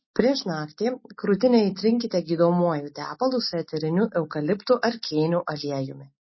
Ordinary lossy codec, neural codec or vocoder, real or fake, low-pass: MP3, 24 kbps; none; real; 7.2 kHz